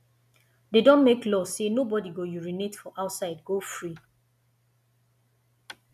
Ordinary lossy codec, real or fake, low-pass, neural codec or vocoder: none; real; 14.4 kHz; none